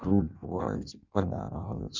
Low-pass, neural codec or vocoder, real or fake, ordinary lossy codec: 7.2 kHz; codec, 16 kHz in and 24 kHz out, 0.6 kbps, FireRedTTS-2 codec; fake; Opus, 64 kbps